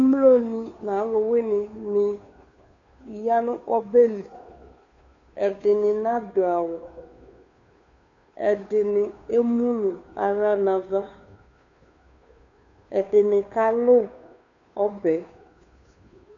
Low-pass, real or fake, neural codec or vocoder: 7.2 kHz; fake; codec, 16 kHz, 2 kbps, FunCodec, trained on Chinese and English, 25 frames a second